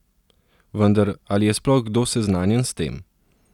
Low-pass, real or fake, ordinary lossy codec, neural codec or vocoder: 19.8 kHz; real; none; none